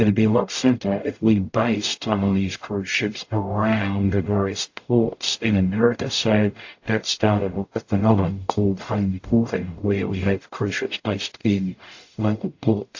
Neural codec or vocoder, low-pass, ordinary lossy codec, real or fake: codec, 44.1 kHz, 0.9 kbps, DAC; 7.2 kHz; AAC, 48 kbps; fake